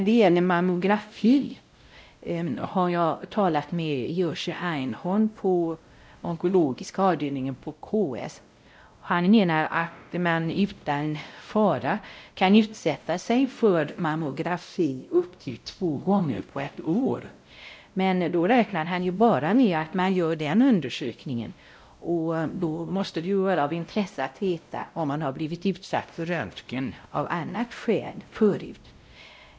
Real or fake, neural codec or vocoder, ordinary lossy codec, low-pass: fake; codec, 16 kHz, 0.5 kbps, X-Codec, WavLM features, trained on Multilingual LibriSpeech; none; none